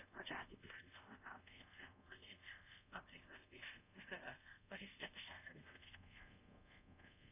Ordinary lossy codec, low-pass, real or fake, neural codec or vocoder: none; 3.6 kHz; fake; codec, 24 kHz, 0.5 kbps, DualCodec